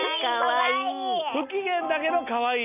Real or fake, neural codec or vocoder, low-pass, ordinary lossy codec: real; none; 3.6 kHz; none